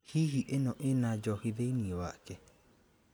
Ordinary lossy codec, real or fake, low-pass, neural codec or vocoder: none; real; none; none